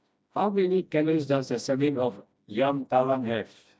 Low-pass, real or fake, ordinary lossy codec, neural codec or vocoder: none; fake; none; codec, 16 kHz, 1 kbps, FreqCodec, smaller model